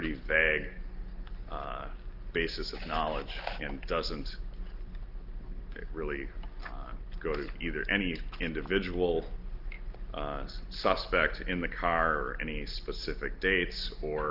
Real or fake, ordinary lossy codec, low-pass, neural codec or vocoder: real; Opus, 32 kbps; 5.4 kHz; none